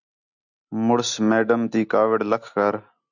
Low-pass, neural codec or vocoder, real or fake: 7.2 kHz; none; real